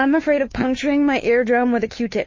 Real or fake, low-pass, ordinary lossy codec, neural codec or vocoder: fake; 7.2 kHz; MP3, 32 kbps; codec, 16 kHz, 2 kbps, FunCodec, trained on Chinese and English, 25 frames a second